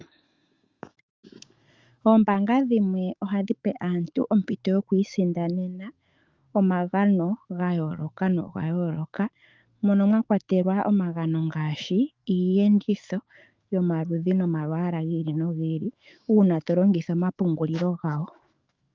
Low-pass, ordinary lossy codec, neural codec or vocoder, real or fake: 7.2 kHz; Opus, 32 kbps; autoencoder, 48 kHz, 128 numbers a frame, DAC-VAE, trained on Japanese speech; fake